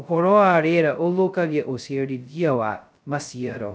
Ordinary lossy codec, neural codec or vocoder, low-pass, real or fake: none; codec, 16 kHz, 0.2 kbps, FocalCodec; none; fake